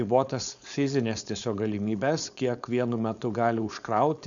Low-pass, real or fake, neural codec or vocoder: 7.2 kHz; fake; codec, 16 kHz, 4.8 kbps, FACodec